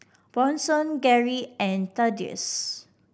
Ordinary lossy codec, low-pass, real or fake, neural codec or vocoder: none; none; real; none